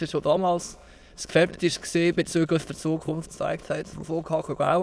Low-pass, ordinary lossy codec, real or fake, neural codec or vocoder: none; none; fake; autoencoder, 22.05 kHz, a latent of 192 numbers a frame, VITS, trained on many speakers